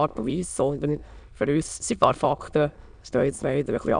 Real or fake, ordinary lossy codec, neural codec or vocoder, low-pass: fake; none; autoencoder, 22.05 kHz, a latent of 192 numbers a frame, VITS, trained on many speakers; 9.9 kHz